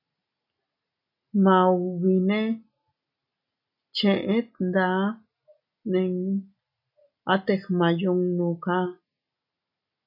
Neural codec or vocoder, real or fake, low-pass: none; real; 5.4 kHz